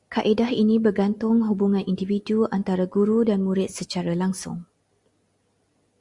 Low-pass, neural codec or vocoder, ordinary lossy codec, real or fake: 10.8 kHz; none; Opus, 64 kbps; real